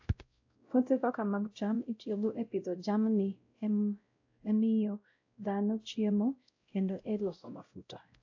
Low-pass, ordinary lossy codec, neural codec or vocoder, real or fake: 7.2 kHz; AAC, 48 kbps; codec, 16 kHz, 0.5 kbps, X-Codec, WavLM features, trained on Multilingual LibriSpeech; fake